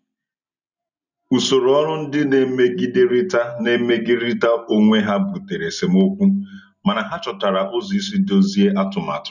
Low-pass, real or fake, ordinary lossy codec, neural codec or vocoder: 7.2 kHz; real; none; none